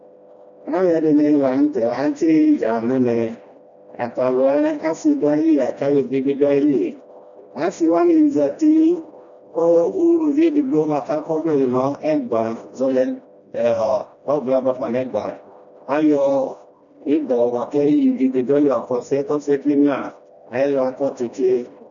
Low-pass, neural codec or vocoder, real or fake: 7.2 kHz; codec, 16 kHz, 1 kbps, FreqCodec, smaller model; fake